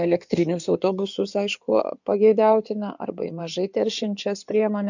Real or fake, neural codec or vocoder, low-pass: fake; codec, 16 kHz in and 24 kHz out, 2.2 kbps, FireRedTTS-2 codec; 7.2 kHz